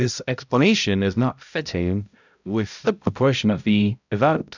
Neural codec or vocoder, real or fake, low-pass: codec, 16 kHz, 0.5 kbps, X-Codec, HuBERT features, trained on balanced general audio; fake; 7.2 kHz